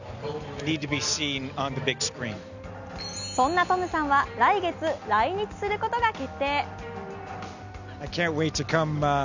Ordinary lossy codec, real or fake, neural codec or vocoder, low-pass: none; real; none; 7.2 kHz